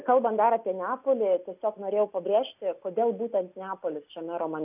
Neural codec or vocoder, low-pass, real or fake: none; 3.6 kHz; real